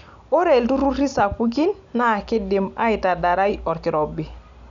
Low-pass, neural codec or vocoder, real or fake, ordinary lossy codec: 7.2 kHz; none; real; none